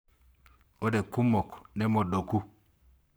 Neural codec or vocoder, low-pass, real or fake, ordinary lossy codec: codec, 44.1 kHz, 7.8 kbps, Pupu-Codec; none; fake; none